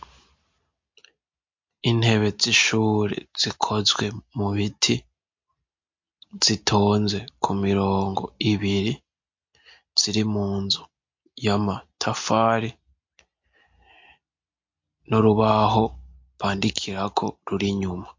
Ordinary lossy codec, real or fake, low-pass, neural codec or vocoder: MP3, 48 kbps; real; 7.2 kHz; none